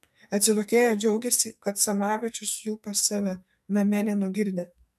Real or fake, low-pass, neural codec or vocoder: fake; 14.4 kHz; codec, 44.1 kHz, 2.6 kbps, SNAC